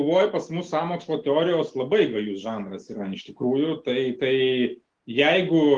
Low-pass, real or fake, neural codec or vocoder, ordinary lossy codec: 9.9 kHz; real; none; Opus, 24 kbps